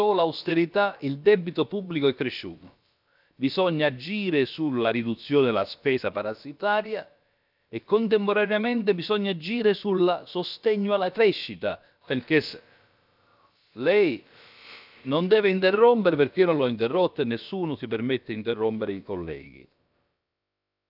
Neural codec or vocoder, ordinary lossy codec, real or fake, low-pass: codec, 16 kHz, about 1 kbps, DyCAST, with the encoder's durations; none; fake; 5.4 kHz